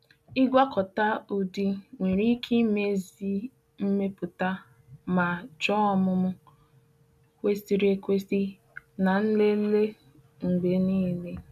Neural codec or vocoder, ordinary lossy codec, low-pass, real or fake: none; none; 14.4 kHz; real